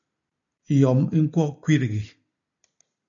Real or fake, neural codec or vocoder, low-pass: real; none; 7.2 kHz